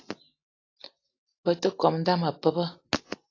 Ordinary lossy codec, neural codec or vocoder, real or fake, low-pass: AAC, 32 kbps; none; real; 7.2 kHz